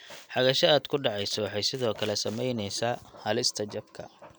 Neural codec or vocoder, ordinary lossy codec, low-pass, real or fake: none; none; none; real